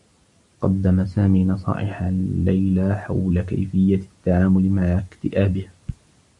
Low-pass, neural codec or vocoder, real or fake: 10.8 kHz; none; real